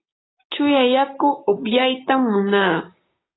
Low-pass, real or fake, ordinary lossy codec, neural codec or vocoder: 7.2 kHz; fake; AAC, 16 kbps; codec, 16 kHz, 6 kbps, DAC